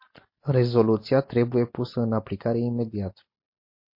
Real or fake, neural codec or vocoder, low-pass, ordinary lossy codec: real; none; 5.4 kHz; MP3, 32 kbps